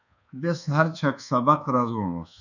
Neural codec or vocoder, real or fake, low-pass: codec, 24 kHz, 1.2 kbps, DualCodec; fake; 7.2 kHz